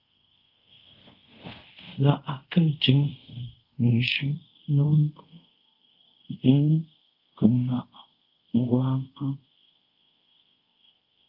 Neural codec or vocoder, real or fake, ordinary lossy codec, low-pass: codec, 24 kHz, 0.5 kbps, DualCodec; fake; Opus, 24 kbps; 5.4 kHz